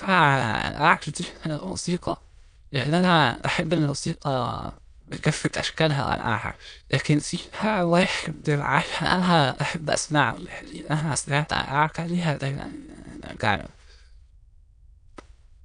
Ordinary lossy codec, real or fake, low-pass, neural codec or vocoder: none; fake; 9.9 kHz; autoencoder, 22.05 kHz, a latent of 192 numbers a frame, VITS, trained on many speakers